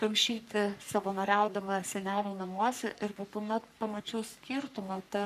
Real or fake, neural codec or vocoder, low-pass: fake; codec, 44.1 kHz, 3.4 kbps, Pupu-Codec; 14.4 kHz